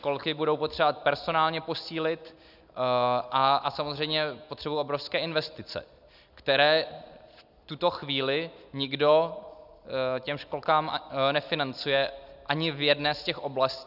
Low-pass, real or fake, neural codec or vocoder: 5.4 kHz; real; none